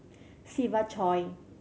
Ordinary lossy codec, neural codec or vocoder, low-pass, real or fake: none; none; none; real